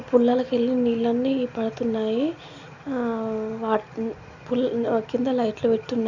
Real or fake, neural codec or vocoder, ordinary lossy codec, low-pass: real; none; none; 7.2 kHz